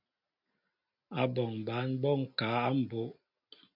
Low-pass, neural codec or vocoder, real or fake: 5.4 kHz; none; real